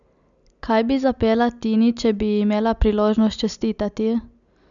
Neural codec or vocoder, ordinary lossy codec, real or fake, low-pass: none; none; real; 7.2 kHz